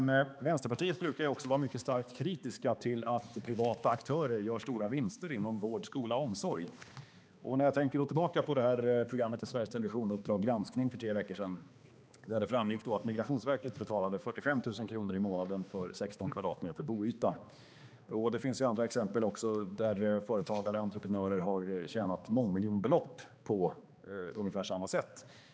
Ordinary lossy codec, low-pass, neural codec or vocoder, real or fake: none; none; codec, 16 kHz, 2 kbps, X-Codec, HuBERT features, trained on balanced general audio; fake